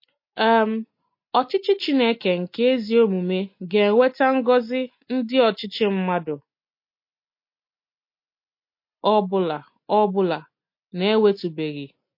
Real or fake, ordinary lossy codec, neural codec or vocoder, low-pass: real; MP3, 32 kbps; none; 5.4 kHz